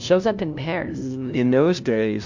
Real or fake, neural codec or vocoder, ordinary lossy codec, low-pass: fake; codec, 16 kHz, 0.5 kbps, FunCodec, trained on LibriTTS, 25 frames a second; MP3, 64 kbps; 7.2 kHz